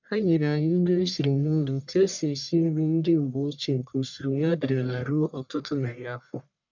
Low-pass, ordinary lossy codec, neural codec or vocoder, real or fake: 7.2 kHz; none; codec, 44.1 kHz, 1.7 kbps, Pupu-Codec; fake